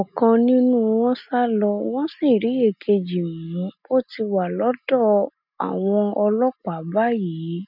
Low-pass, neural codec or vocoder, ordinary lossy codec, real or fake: 5.4 kHz; none; none; real